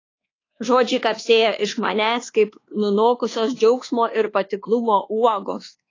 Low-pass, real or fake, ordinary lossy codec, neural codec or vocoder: 7.2 kHz; fake; AAC, 32 kbps; codec, 24 kHz, 1.2 kbps, DualCodec